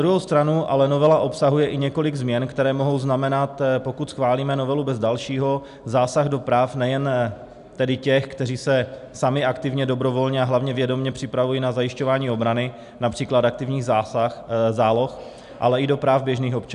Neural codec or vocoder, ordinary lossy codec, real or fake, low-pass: none; AAC, 96 kbps; real; 10.8 kHz